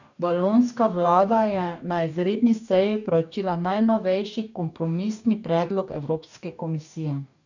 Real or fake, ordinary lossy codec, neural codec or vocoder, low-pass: fake; none; codec, 44.1 kHz, 2.6 kbps, DAC; 7.2 kHz